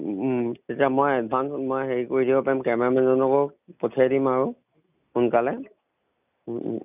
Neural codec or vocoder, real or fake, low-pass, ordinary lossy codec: none; real; 3.6 kHz; none